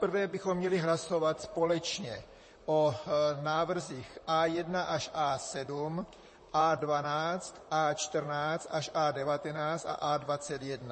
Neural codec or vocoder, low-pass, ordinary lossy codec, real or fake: vocoder, 44.1 kHz, 128 mel bands, Pupu-Vocoder; 10.8 kHz; MP3, 32 kbps; fake